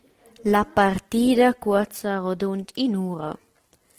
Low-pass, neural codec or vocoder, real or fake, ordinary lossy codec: 14.4 kHz; none; real; Opus, 16 kbps